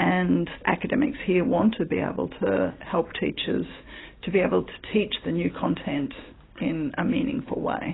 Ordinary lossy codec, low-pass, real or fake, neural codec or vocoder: AAC, 16 kbps; 7.2 kHz; real; none